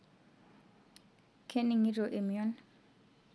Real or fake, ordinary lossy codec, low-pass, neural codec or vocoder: real; none; none; none